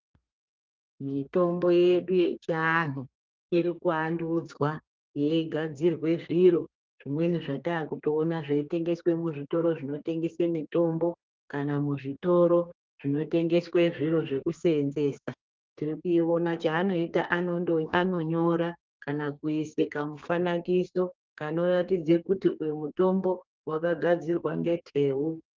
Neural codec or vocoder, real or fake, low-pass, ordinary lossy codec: codec, 32 kHz, 1.9 kbps, SNAC; fake; 7.2 kHz; Opus, 24 kbps